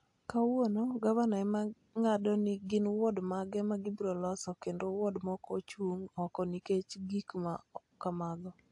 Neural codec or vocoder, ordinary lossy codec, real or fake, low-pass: none; AAC, 64 kbps; real; 10.8 kHz